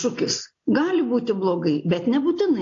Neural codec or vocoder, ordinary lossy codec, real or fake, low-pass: none; AAC, 48 kbps; real; 7.2 kHz